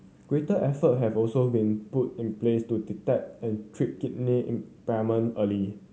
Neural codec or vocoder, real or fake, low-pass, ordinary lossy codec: none; real; none; none